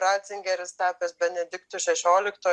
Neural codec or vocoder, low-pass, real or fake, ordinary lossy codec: none; 10.8 kHz; real; Opus, 32 kbps